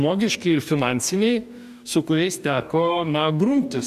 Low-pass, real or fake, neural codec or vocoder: 14.4 kHz; fake; codec, 44.1 kHz, 2.6 kbps, DAC